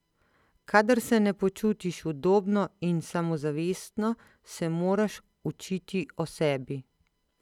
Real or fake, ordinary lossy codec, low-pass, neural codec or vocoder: real; none; 19.8 kHz; none